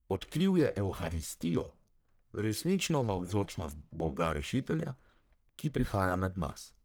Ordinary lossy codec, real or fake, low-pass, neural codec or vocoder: none; fake; none; codec, 44.1 kHz, 1.7 kbps, Pupu-Codec